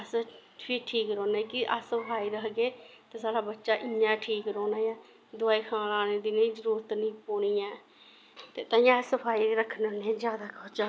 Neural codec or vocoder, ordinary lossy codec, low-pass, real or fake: none; none; none; real